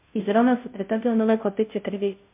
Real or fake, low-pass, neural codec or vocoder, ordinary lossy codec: fake; 3.6 kHz; codec, 16 kHz, 0.5 kbps, FunCodec, trained on Chinese and English, 25 frames a second; MP3, 32 kbps